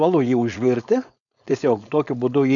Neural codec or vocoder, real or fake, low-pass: codec, 16 kHz, 4.8 kbps, FACodec; fake; 7.2 kHz